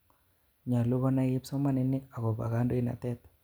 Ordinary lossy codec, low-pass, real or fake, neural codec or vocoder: none; none; real; none